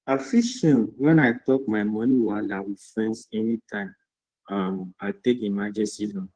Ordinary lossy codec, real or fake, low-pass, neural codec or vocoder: Opus, 16 kbps; fake; 9.9 kHz; codec, 16 kHz in and 24 kHz out, 2.2 kbps, FireRedTTS-2 codec